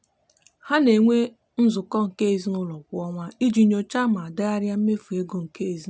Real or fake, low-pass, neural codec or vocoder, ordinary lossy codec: real; none; none; none